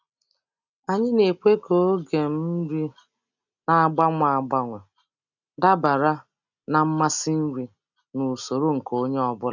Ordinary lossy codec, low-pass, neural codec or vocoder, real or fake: none; 7.2 kHz; none; real